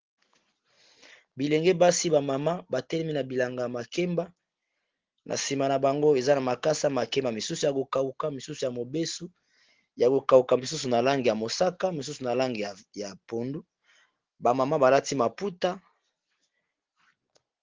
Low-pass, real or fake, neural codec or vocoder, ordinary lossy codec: 7.2 kHz; real; none; Opus, 16 kbps